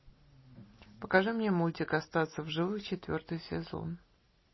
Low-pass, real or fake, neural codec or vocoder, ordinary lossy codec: 7.2 kHz; real; none; MP3, 24 kbps